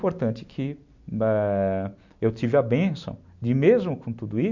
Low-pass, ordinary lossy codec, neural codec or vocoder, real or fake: 7.2 kHz; MP3, 64 kbps; none; real